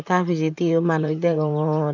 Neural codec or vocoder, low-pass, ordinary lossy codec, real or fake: vocoder, 44.1 kHz, 128 mel bands, Pupu-Vocoder; 7.2 kHz; none; fake